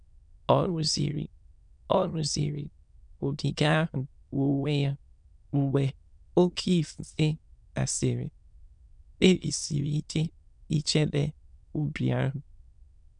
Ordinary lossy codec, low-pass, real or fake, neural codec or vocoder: none; 9.9 kHz; fake; autoencoder, 22.05 kHz, a latent of 192 numbers a frame, VITS, trained on many speakers